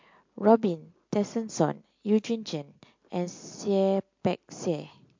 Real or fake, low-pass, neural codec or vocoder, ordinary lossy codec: real; 7.2 kHz; none; MP3, 48 kbps